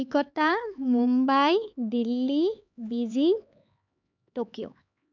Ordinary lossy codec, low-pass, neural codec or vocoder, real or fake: none; 7.2 kHz; codec, 16 kHz, 4 kbps, X-Codec, HuBERT features, trained on LibriSpeech; fake